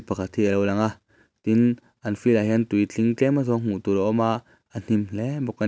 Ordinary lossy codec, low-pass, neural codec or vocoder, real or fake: none; none; none; real